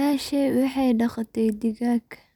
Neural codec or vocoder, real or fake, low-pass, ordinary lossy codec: none; real; 19.8 kHz; none